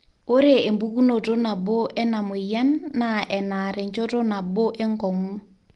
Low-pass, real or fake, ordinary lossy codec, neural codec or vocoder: 10.8 kHz; real; Opus, 24 kbps; none